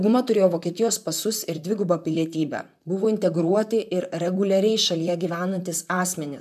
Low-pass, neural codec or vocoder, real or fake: 14.4 kHz; vocoder, 44.1 kHz, 128 mel bands, Pupu-Vocoder; fake